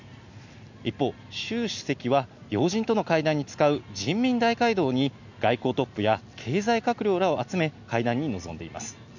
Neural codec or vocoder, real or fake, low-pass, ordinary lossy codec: none; real; 7.2 kHz; none